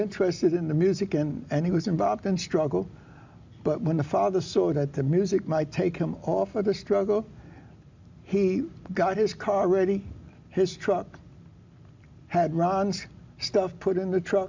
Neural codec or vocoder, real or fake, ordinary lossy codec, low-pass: none; real; MP3, 64 kbps; 7.2 kHz